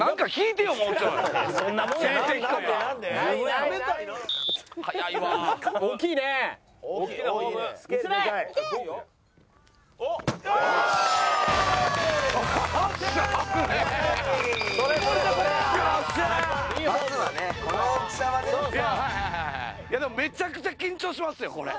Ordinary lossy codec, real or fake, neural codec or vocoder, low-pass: none; real; none; none